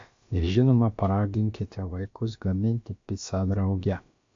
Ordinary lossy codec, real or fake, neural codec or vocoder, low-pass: AAC, 48 kbps; fake; codec, 16 kHz, about 1 kbps, DyCAST, with the encoder's durations; 7.2 kHz